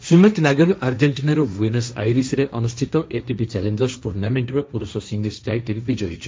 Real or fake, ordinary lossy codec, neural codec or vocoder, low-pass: fake; none; codec, 16 kHz, 1.1 kbps, Voila-Tokenizer; none